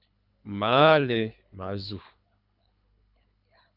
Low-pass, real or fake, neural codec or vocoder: 5.4 kHz; fake; codec, 24 kHz, 3 kbps, HILCodec